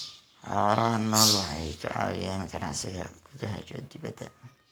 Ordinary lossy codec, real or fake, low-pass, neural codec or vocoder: none; fake; none; codec, 44.1 kHz, 7.8 kbps, Pupu-Codec